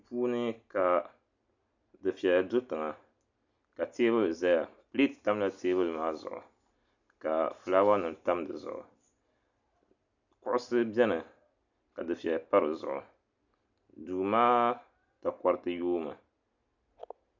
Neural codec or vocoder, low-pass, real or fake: none; 7.2 kHz; real